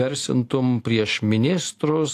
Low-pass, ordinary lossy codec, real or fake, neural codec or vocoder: 14.4 kHz; AAC, 64 kbps; real; none